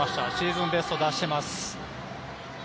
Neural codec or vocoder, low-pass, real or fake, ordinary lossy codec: none; none; real; none